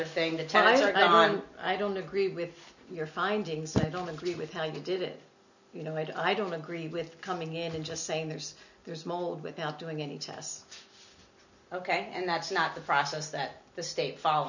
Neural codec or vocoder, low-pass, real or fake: none; 7.2 kHz; real